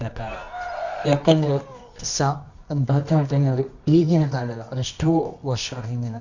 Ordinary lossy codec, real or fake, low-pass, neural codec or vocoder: Opus, 64 kbps; fake; 7.2 kHz; codec, 24 kHz, 0.9 kbps, WavTokenizer, medium music audio release